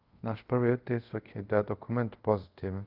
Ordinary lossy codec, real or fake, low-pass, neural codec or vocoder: Opus, 24 kbps; fake; 5.4 kHz; codec, 24 kHz, 0.5 kbps, DualCodec